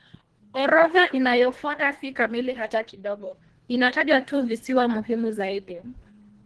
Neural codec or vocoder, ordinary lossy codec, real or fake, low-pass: codec, 24 kHz, 1.5 kbps, HILCodec; Opus, 16 kbps; fake; 10.8 kHz